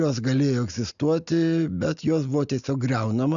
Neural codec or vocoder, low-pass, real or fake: none; 7.2 kHz; real